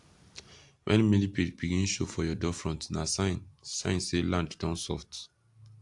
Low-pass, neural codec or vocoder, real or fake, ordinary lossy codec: 10.8 kHz; none; real; AAC, 64 kbps